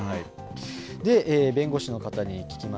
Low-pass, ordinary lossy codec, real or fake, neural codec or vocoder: none; none; real; none